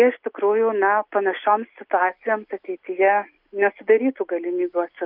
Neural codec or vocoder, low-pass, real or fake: none; 5.4 kHz; real